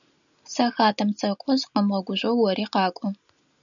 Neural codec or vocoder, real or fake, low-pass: none; real; 7.2 kHz